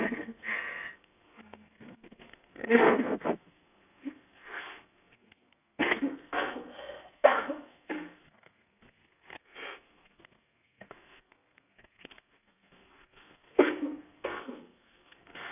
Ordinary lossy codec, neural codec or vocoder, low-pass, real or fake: none; codec, 32 kHz, 1.9 kbps, SNAC; 3.6 kHz; fake